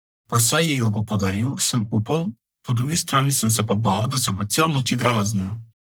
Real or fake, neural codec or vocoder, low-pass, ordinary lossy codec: fake; codec, 44.1 kHz, 1.7 kbps, Pupu-Codec; none; none